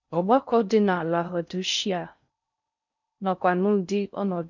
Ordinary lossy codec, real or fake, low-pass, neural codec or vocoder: none; fake; 7.2 kHz; codec, 16 kHz in and 24 kHz out, 0.6 kbps, FocalCodec, streaming, 4096 codes